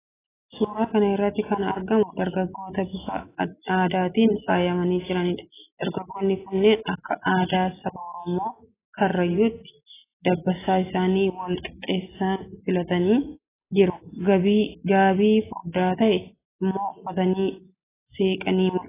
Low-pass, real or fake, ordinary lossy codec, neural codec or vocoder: 3.6 kHz; real; AAC, 16 kbps; none